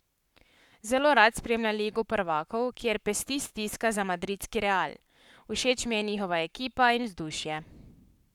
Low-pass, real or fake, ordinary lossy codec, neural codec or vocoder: 19.8 kHz; fake; none; codec, 44.1 kHz, 7.8 kbps, Pupu-Codec